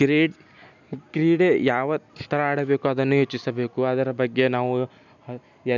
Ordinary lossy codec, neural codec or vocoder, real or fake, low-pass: none; none; real; 7.2 kHz